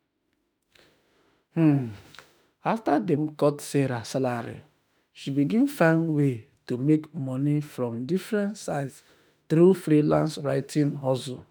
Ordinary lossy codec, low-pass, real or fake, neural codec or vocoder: none; none; fake; autoencoder, 48 kHz, 32 numbers a frame, DAC-VAE, trained on Japanese speech